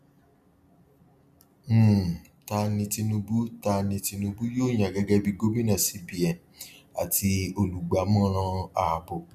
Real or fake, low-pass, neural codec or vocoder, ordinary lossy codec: real; 14.4 kHz; none; none